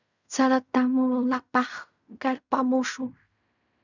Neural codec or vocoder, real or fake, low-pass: codec, 16 kHz in and 24 kHz out, 0.4 kbps, LongCat-Audio-Codec, fine tuned four codebook decoder; fake; 7.2 kHz